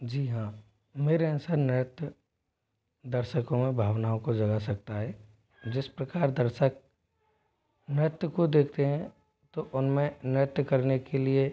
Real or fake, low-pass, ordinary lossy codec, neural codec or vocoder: real; none; none; none